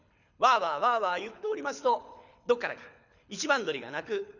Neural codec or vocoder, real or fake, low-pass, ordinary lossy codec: codec, 24 kHz, 6 kbps, HILCodec; fake; 7.2 kHz; none